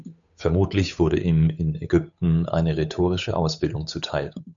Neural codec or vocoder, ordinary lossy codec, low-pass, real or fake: codec, 16 kHz, 8 kbps, FunCodec, trained on Chinese and English, 25 frames a second; MP3, 96 kbps; 7.2 kHz; fake